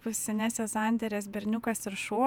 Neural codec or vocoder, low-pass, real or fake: vocoder, 48 kHz, 128 mel bands, Vocos; 19.8 kHz; fake